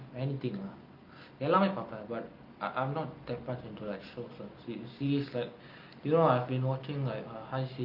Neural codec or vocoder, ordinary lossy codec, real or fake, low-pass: none; Opus, 24 kbps; real; 5.4 kHz